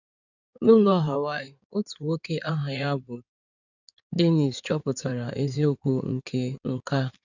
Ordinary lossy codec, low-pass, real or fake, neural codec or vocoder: none; 7.2 kHz; fake; codec, 16 kHz in and 24 kHz out, 2.2 kbps, FireRedTTS-2 codec